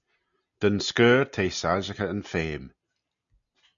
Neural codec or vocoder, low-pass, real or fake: none; 7.2 kHz; real